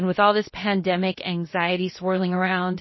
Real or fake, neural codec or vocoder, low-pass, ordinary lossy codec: fake; codec, 16 kHz, about 1 kbps, DyCAST, with the encoder's durations; 7.2 kHz; MP3, 24 kbps